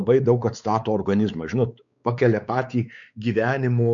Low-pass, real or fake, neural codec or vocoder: 7.2 kHz; fake; codec, 16 kHz, 4 kbps, X-Codec, WavLM features, trained on Multilingual LibriSpeech